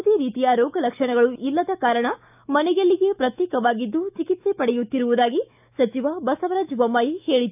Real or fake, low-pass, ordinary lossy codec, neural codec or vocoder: fake; 3.6 kHz; none; autoencoder, 48 kHz, 128 numbers a frame, DAC-VAE, trained on Japanese speech